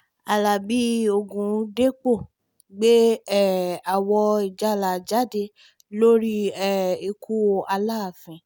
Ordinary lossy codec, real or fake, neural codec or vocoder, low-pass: none; real; none; none